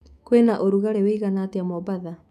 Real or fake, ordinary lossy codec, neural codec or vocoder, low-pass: fake; none; autoencoder, 48 kHz, 128 numbers a frame, DAC-VAE, trained on Japanese speech; 14.4 kHz